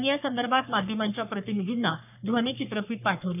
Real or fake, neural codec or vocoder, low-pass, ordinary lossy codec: fake; codec, 44.1 kHz, 3.4 kbps, Pupu-Codec; 3.6 kHz; none